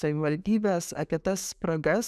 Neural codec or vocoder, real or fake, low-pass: autoencoder, 48 kHz, 128 numbers a frame, DAC-VAE, trained on Japanese speech; fake; 14.4 kHz